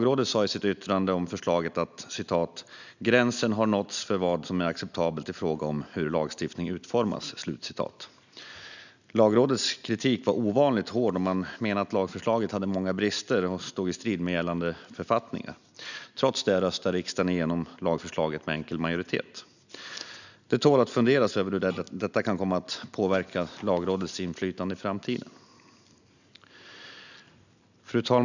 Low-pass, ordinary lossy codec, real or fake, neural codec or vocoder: 7.2 kHz; none; real; none